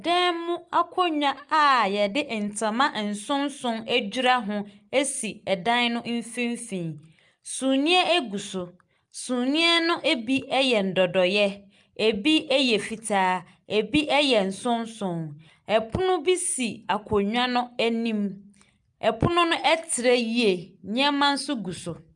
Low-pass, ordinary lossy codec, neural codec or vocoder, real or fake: 10.8 kHz; Opus, 32 kbps; none; real